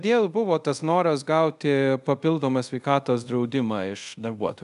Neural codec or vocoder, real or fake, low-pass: codec, 24 kHz, 0.5 kbps, DualCodec; fake; 10.8 kHz